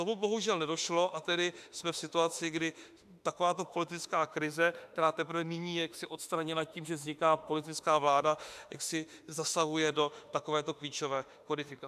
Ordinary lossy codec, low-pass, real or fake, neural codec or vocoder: AAC, 96 kbps; 14.4 kHz; fake; autoencoder, 48 kHz, 32 numbers a frame, DAC-VAE, trained on Japanese speech